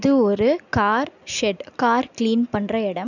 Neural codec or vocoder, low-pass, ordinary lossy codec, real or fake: none; 7.2 kHz; none; real